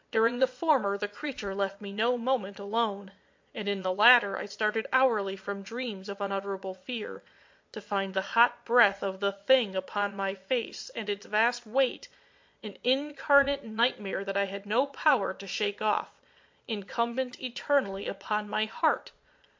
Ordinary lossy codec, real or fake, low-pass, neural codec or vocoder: MP3, 48 kbps; fake; 7.2 kHz; vocoder, 22.05 kHz, 80 mel bands, Vocos